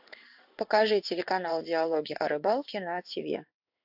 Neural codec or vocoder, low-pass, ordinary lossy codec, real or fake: codec, 16 kHz in and 24 kHz out, 1 kbps, XY-Tokenizer; 5.4 kHz; AAC, 48 kbps; fake